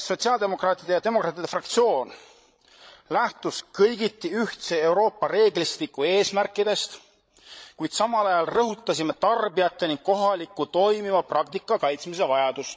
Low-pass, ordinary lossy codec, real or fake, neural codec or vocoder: none; none; fake; codec, 16 kHz, 16 kbps, FreqCodec, larger model